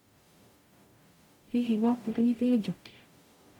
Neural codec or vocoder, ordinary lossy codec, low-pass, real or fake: codec, 44.1 kHz, 0.9 kbps, DAC; MP3, 96 kbps; 19.8 kHz; fake